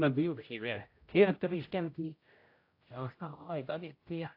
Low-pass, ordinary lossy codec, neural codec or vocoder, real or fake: 5.4 kHz; AAC, 32 kbps; codec, 16 kHz, 0.5 kbps, X-Codec, HuBERT features, trained on general audio; fake